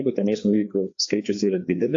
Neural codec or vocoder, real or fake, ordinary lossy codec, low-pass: codec, 16 kHz, 2 kbps, FreqCodec, larger model; fake; AAC, 48 kbps; 7.2 kHz